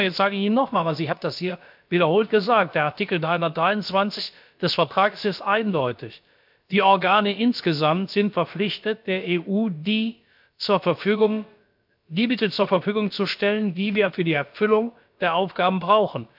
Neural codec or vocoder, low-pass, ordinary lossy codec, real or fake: codec, 16 kHz, about 1 kbps, DyCAST, with the encoder's durations; 5.4 kHz; MP3, 48 kbps; fake